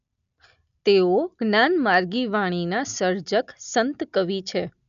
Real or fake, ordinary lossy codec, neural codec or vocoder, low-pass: real; none; none; 7.2 kHz